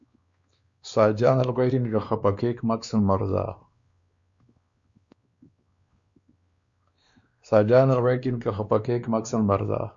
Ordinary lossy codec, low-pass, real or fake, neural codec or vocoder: Opus, 64 kbps; 7.2 kHz; fake; codec, 16 kHz, 2 kbps, X-Codec, WavLM features, trained on Multilingual LibriSpeech